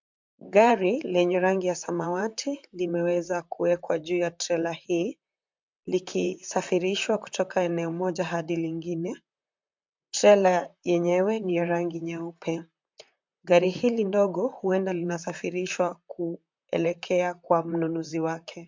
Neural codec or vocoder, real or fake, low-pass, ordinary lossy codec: vocoder, 44.1 kHz, 128 mel bands, Pupu-Vocoder; fake; 7.2 kHz; MP3, 64 kbps